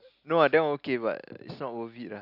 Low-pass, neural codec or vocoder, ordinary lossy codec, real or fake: 5.4 kHz; none; Opus, 64 kbps; real